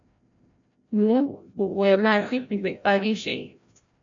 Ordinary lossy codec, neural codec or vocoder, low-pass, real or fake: AAC, 64 kbps; codec, 16 kHz, 0.5 kbps, FreqCodec, larger model; 7.2 kHz; fake